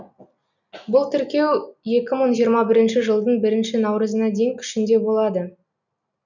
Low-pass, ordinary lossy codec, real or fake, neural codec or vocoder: 7.2 kHz; none; real; none